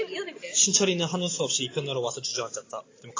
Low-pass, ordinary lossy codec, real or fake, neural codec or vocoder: 7.2 kHz; AAC, 32 kbps; real; none